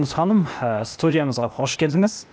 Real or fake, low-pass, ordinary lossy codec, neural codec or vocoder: fake; none; none; codec, 16 kHz, 0.8 kbps, ZipCodec